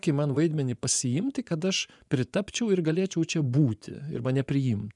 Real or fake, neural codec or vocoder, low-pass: fake; vocoder, 24 kHz, 100 mel bands, Vocos; 10.8 kHz